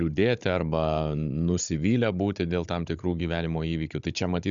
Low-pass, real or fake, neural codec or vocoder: 7.2 kHz; fake; codec, 16 kHz, 16 kbps, FunCodec, trained on Chinese and English, 50 frames a second